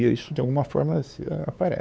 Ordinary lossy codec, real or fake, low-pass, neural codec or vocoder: none; fake; none; codec, 16 kHz, 4 kbps, X-Codec, WavLM features, trained on Multilingual LibriSpeech